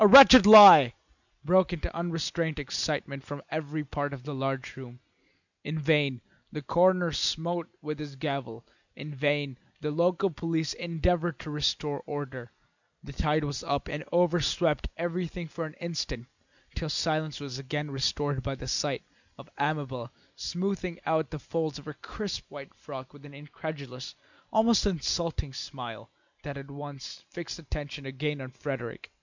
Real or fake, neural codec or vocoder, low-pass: real; none; 7.2 kHz